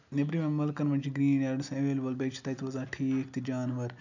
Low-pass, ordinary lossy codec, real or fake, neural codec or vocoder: 7.2 kHz; none; real; none